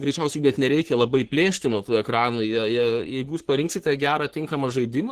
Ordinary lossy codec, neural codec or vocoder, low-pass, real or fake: Opus, 16 kbps; codec, 44.1 kHz, 3.4 kbps, Pupu-Codec; 14.4 kHz; fake